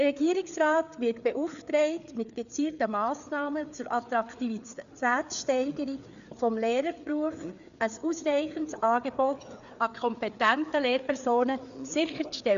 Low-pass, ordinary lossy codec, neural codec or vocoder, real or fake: 7.2 kHz; none; codec, 16 kHz, 4 kbps, FreqCodec, larger model; fake